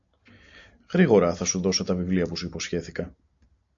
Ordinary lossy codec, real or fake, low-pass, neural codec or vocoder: AAC, 64 kbps; real; 7.2 kHz; none